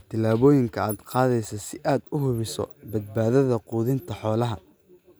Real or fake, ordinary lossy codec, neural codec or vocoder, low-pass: real; none; none; none